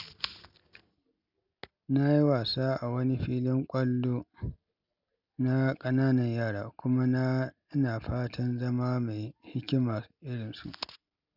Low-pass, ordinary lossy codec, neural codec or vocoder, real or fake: 5.4 kHz; none; none; real